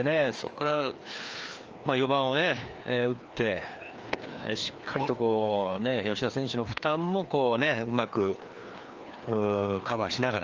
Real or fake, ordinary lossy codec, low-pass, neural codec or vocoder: fake; Opus, 24 kbps; 7.2 kHz; codec, 16 kHz, 2 kbps, FreqCodec, larger model